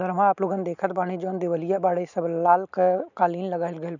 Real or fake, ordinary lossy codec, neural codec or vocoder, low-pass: real; none; none; 7.2 kHz